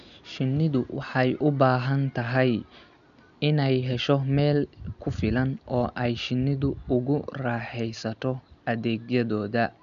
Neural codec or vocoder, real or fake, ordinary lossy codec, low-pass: none; real; none; 7.2 kHz